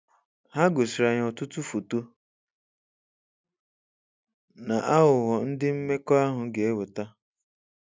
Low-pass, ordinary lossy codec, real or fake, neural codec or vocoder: none; none; real; none